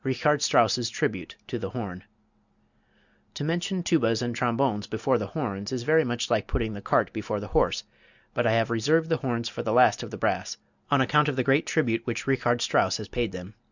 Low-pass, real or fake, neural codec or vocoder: 7.2 kHz; real; none